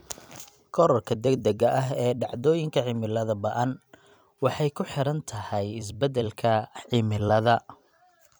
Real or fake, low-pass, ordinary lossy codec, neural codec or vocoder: fake; none; none; vocoder, 44.1 kHz, 128 mel bands every 256 samples, BigVGAN v2